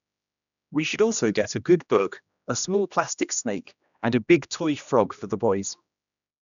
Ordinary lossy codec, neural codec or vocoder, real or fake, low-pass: none; codec, 16 kHz, 1 kbps, X-Codec, HuBERT features, trained on general audio; fake; 7.2 kHz